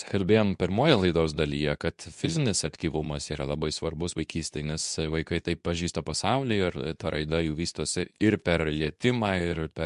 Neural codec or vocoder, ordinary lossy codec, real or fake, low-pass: codec, 24 kHz, 0.9 kbps, WavTokenizer, medium speech release version 2; MP3, 64 kbps; fake; 10.8 kHz